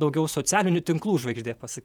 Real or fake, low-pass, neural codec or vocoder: fake; 19.8 kHz; vocoder, 48 kHz, 128 mel bands, Vocos